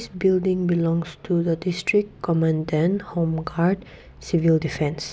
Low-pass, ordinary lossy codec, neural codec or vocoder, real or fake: none; none; none; real